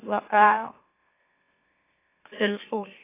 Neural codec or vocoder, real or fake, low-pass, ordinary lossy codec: autoencoder, 44.1 kHz, a latent of 192 numbers a frame, MeloTTS; fake; 3.6 kHz; AAC, 24 kbps